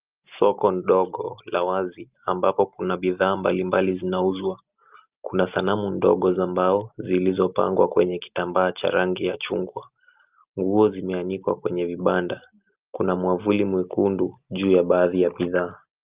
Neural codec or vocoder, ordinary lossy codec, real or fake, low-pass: none; Opus, 24 kbps; real; 3.6 kHz